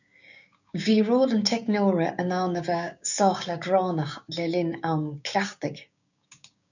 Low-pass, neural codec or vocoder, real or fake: 7.2 kHz; codec, 16 kHz, 6 kbps, DAC; fake